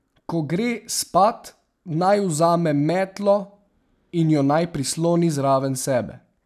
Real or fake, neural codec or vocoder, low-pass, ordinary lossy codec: real; none; 14.4 kHz; none